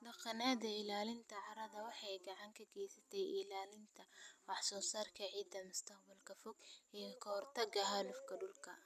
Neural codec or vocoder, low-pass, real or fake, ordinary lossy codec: none; 14.4 kHz; real; none